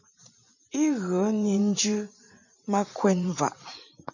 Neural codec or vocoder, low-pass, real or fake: vocoder, 44.1 kHz, 128 mel bands every 512 samples, BigVGAN v2; 7.2 kHz; fake